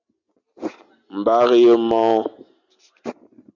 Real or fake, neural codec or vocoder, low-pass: real; none; 7.2 kHz